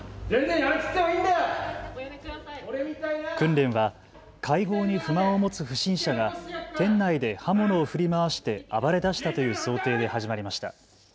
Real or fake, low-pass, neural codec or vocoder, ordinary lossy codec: real; none; none; none